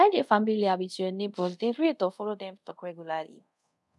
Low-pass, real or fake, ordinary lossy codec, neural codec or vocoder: none; fake; none; codec, 24 kHz, 0.5 kbps, DualCodec